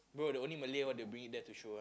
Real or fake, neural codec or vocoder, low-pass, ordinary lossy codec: real; none; none; none